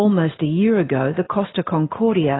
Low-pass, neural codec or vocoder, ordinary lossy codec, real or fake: 7.2 kHz; none; AAC, 16 kbps; real